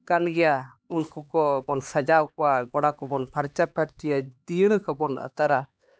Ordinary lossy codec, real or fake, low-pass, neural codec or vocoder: none; fake; none; codec, 16 kHz, 2 kbps, X-Codec, HuBERT features, trained on LibriSpeech